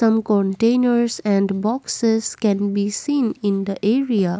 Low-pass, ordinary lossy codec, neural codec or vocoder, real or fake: none; none; none; real